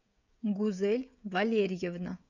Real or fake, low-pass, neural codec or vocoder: fake; 7.2 kHz; codec, 16 kHz, 16 kbps, FreqCodec, smaller model